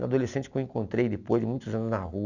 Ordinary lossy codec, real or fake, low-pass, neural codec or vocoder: none; real; 7.2 kHz; none